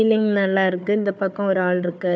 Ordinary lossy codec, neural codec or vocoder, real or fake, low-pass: none; codec, 16 kHz, 4 kbps, FunCodec, trained on Chinese and English, 50 frames a second; fake; none